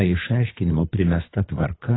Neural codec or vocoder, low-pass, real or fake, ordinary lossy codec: codec, 24 kHz, 3 kbps, HILCodec; 7.2 kHz; fake; AAC, 16 kbps